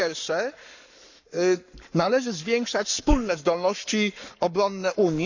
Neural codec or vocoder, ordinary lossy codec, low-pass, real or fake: codec, 44.1 kHz, 7.8 kbps, Pupu-Codec; none; 7.2 kHz; fake